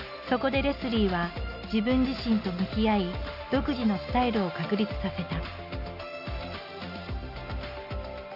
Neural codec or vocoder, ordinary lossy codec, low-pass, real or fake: none; none; 5.4 kHz; real